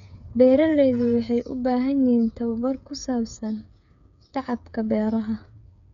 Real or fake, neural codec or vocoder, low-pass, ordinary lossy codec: fake; codec, 16 kHz, 8 kbps, FreqCodec, smaller model; 7.2 kHz; none